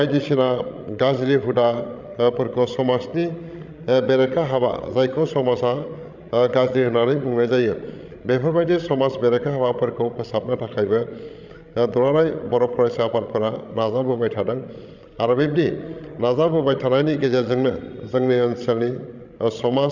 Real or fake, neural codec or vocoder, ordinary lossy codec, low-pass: fake; codec, 16 kHz, 16 kbps, FreqCodec, larger model; none; 7.2 kHz